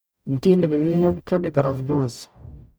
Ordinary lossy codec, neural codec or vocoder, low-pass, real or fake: none; codec, 44.1 kHz, 0.9 kbps, DAC; none; fake